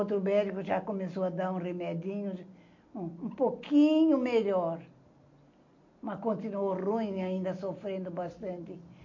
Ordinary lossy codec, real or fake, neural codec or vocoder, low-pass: MP3, 48 kbps; real; none; 7.2 kHz